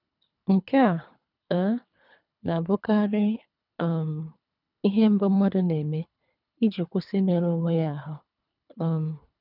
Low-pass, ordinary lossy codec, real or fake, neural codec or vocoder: 5.4 kHz; none; fake; codec, 24 kHz, 3 kbps, HILCodec